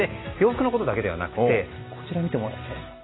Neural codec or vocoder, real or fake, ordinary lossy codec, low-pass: none; real; AAC, 16 kbps; 7.2 kHz